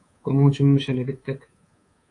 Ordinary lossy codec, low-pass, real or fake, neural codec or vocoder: AAC, 48 kbps; 10.8 kHz; fake; codec, 24 kHz, 3.1 kbps, DualCodec